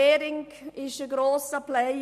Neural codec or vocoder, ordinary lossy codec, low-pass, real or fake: none; none; 14.4 kHz; real